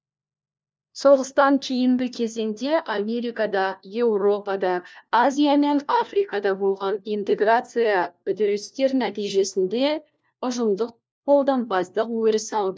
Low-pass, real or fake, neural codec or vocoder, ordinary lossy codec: none; fake; codec, 16 kHz, 1 kbps, FunCodec, trained on LibriTTS, 50 frames a second; none